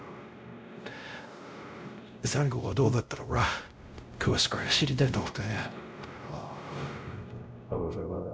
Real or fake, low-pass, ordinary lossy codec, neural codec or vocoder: fake; none; none; codec, 16 kHz, 0.5 kbps, X-Codec, WavLM features, trained on Multilingual LibriSpeech